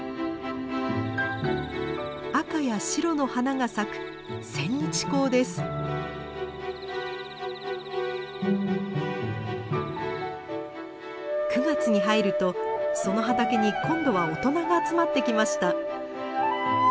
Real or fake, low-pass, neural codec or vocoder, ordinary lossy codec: real; none; none; none